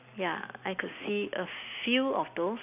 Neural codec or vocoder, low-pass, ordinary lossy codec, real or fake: none; 3.6 kHz; none; real